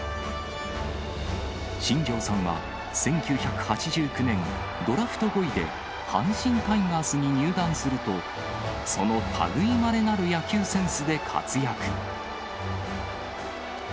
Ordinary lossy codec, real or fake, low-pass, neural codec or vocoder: none; real; none; none